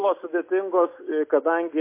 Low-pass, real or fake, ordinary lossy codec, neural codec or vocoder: 3.6 kHz; real; MP3, 24 kbps; none